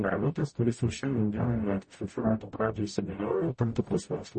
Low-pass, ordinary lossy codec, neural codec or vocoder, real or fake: 10.8 kHz; MP3, 32 kbps; codec, 44.1 kHz, 0.9 kbps, DAC; fake